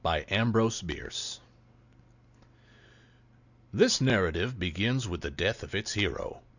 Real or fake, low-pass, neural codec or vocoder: real; 7.2 kHz; none